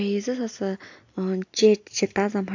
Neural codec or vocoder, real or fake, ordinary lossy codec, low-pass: none; real; AAC, 48 kbps; 7.2 kHz